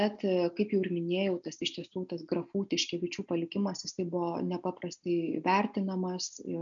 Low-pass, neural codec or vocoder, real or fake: 7.2 kHz; none; real